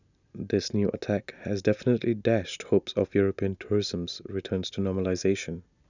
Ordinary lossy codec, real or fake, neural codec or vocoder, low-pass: none; real; none; 7.2 kHz